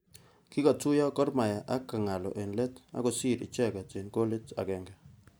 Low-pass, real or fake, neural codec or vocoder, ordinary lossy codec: none; real; none; none